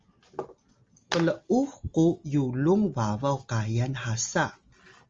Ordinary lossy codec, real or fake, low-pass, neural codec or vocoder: Opus, 32 kbps; real; 7.2 kHz; none